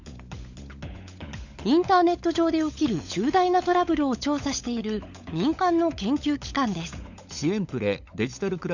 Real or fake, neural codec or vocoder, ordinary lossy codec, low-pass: fake; codec, 16 kHz, 16 kbps, FunCodec, trained on LibriTTS, 50 frames a second; none; 7.2 kHz